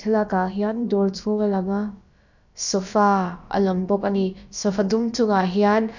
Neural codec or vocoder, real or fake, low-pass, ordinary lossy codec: codec, 16 kHz, about 1 kbps, DyCAST, with the encoder's durations; fake; 7.2 kHz; none